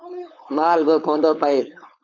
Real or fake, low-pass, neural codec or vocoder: fake; 7.2 kHz; codec, 16 kHz, 4.8 kbps, FACodec